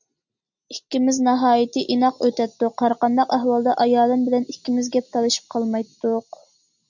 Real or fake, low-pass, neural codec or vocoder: real; 7.2 kHz; none